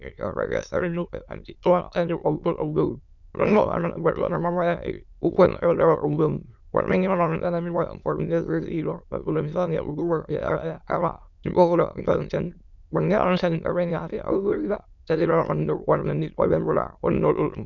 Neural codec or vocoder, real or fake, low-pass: autoencoder, 22.05 kHz, a latent of 192 numbers a frame, VITS, trained on many speakers; fake; 7.2 kHz